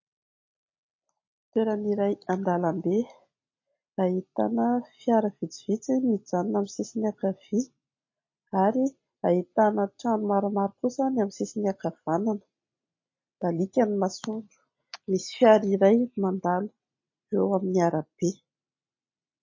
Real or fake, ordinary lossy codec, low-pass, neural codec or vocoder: real; MP3, 32 kbps; 7.2 kHz; none